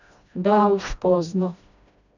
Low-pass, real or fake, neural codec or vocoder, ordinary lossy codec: 7.2 kHz; fake; codec, 16 kHz, 1 kbps, FreqCodec, smaller model; none